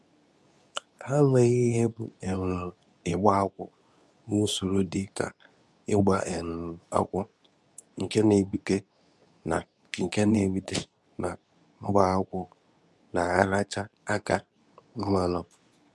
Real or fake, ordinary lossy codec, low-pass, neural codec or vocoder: fake; none; none; codec, 24 kHz, 0.9 kbps, WavTokenizer, medium speech release version 2